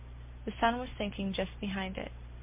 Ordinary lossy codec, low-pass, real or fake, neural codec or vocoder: MP3, 32 kbps; 3.6 kHz; real; none